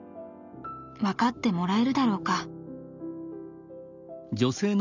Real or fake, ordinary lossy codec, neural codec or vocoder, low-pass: real; none; none; 7.2 kHz